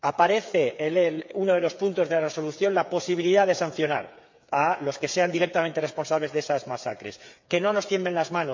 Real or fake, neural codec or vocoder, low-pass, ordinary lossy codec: fake; codec, 16 kHz, 8 kbps, FreqCodec, smaller model; 7.2 kHz; MP3, 48 kbps